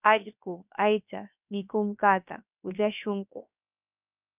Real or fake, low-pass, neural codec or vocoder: fake; 3.6 kHz; codec, 16 kHz, 0.7 kbps, FocalCodec